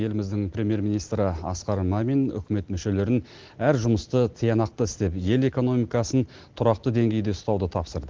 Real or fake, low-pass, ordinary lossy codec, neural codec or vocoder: real; 7.2 kHz; Opus, 16 kbps; none